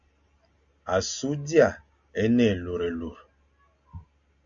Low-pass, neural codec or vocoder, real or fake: 7.2 kHz; none; real